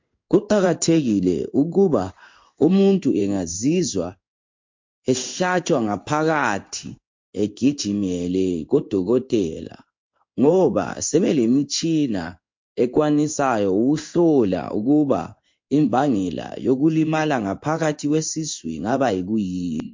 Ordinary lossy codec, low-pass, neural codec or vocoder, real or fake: MP3, 48 kbps; 7.2 kHz; codec, 16 kHz in and 24 kHz out, 1 kbps, XY-Tokenizer; fake